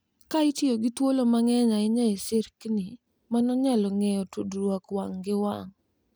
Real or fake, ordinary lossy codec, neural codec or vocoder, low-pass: real; none; none; none